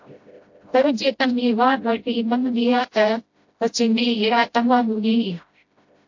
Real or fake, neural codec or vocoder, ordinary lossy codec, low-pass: fake; codec, 16 kHz, 0.5 kbps, FreqCodec, smaller model; AAC, 48 kbps; 7.2 kHz